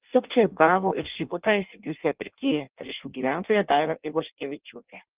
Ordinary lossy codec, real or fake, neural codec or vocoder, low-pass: Opus, 32 kbps; fake; codec, 16 kHz in and 24 kHz out, 0.6 kbps, FireRedTTS-2 codec; 3.6 kHz